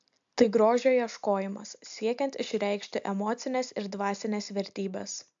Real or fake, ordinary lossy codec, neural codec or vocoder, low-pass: real; AAC, 64 kbps; none; 7.2 kHz